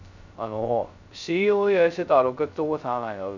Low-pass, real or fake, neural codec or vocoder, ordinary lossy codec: 7.2 kHz; fake; codec, 16 kHz, 0.2 kbps, FocalCodec; none